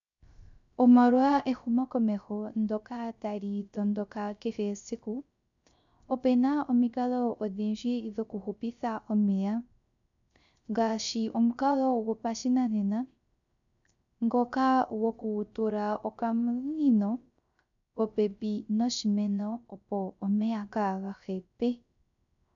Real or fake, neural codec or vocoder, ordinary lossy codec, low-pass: fake; codec, 16 kHz, 0.3 kbps, FocalCodec; MP3, 96 kbps; 7.2 kHz